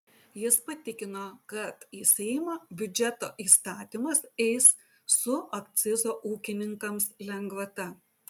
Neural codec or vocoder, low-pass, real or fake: none; 19.8 kHz; real